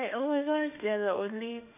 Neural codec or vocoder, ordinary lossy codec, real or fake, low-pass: autoencoder, 48 kHz, 32 numbers a frame, DAC-VAE, trained on Japanese speech; MP3, 32 kbps; fake; 3.6 kHz